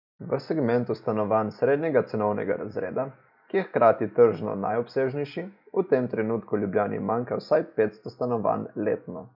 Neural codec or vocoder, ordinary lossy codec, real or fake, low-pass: none; none; real; 5.4 kHz